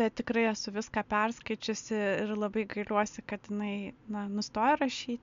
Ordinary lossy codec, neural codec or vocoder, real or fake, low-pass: MP3, 64 kbps; none; real; 7.2 kHz